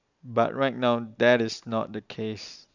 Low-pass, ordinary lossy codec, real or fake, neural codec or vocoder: 7.2 kHz; none; real; none